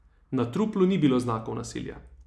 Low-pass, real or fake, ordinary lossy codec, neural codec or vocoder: none; real; none; none